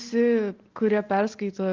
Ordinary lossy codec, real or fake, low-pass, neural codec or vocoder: Opus, 32 kbps; real; 7.2 kHz; none